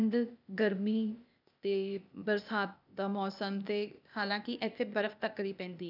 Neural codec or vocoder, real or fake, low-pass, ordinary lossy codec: codec, 16 kHz, 0.8 kbps, ZipCodec; fake; 5.4 kHz; none